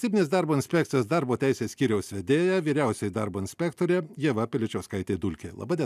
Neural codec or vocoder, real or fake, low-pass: none; real; 14.4 kHz